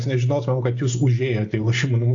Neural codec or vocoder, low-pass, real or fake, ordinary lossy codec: none; 7.2 kHz; real; AAC, 48 kbps